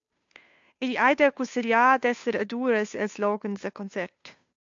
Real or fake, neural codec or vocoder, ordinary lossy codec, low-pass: fake; codec, 16 kHz, 2 kbps, FunCodec, trained on Chinese and English, 25 frames a second; AAC, 64 kbps; 7.2 kHz